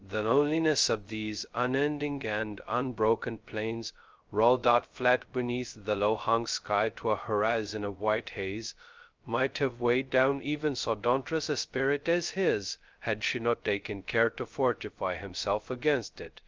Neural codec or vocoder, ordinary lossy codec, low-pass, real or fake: codec, 16 kHz, 0.2 kbps, FocalCodec; Opus, 24 kbps; 7.2 kHz; fake